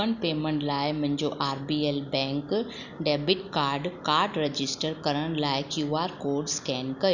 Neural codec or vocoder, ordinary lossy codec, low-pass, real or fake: none; none; 7.2 kHz; real